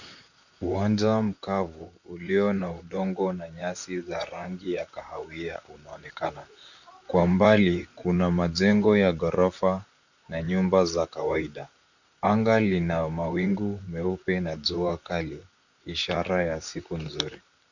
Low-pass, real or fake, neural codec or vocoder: 7.2 kHz; fake; vocoder, 44.1 kHz, 128 mel bands, Pupu-Vocoder